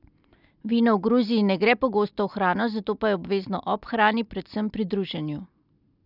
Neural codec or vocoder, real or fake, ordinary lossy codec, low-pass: none; real; none; 5.4 kHz